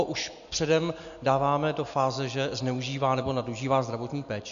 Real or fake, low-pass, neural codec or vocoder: real; 7.2 kHz; none